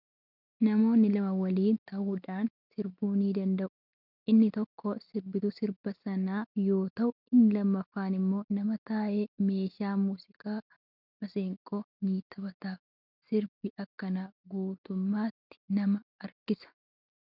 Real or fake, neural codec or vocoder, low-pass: real; none; 5.4 kHz